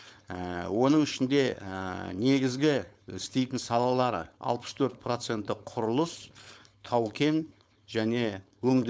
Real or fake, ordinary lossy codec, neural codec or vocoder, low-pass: fake; none; codec, 16 kHz, 4.8 kbps, FACodec; none